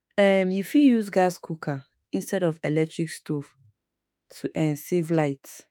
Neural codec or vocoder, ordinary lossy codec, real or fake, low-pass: autoencoder, 48 kHz, 32 numbers a frame, DAC-VAE, trained on Japanese speech; none; fake; none